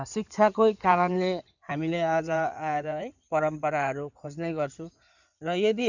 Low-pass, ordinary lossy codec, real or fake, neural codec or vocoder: 7.2 kHz; none; fake; codec, 16 kHz in and 24 kHz out, 2.2 kbps, FireRedTTS-2 codec